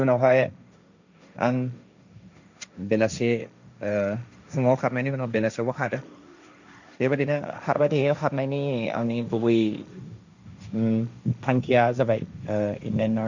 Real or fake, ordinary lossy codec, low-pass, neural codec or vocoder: fake; none; 7.2 kHz; codec, 16 kHz, 1.1 kbps, Voila-Tokenizer